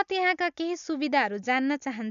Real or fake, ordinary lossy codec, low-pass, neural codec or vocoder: real; none; 7.2 kHz; none